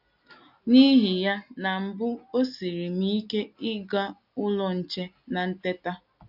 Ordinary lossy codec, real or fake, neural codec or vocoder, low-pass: none; real; none; 5.4 kHz